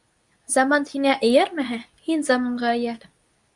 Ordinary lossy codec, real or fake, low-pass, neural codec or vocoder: Opus, 64 kbps; fake; 10.8 kHz; codec, 24 kHz, 0.9 kbps, WavTokenizer, medium speech release version 2